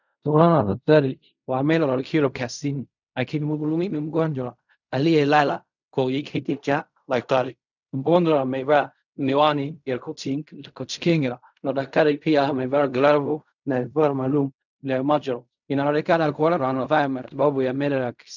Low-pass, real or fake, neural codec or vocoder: 7.2 kHz; fake; codec, 16 kHz in and 24 kHz out, 0.4 kbps, LongCat-Audio-Codec, fine tuned four codebook decoder